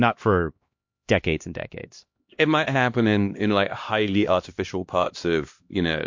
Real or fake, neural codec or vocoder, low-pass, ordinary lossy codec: fake; codec, 16 kHz, 1 kbps, X-Codec, HuBERT features, trained on LibriSpeech; 7.2 kHz; MP3, 48 kbps